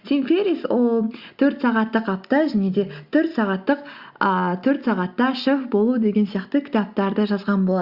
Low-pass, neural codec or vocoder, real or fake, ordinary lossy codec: 5.4 kHz; none; real; Opus, 64 kbps